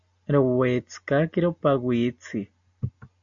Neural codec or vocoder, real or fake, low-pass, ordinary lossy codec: none; real; 7.2 kHz; MP3, 64 kbps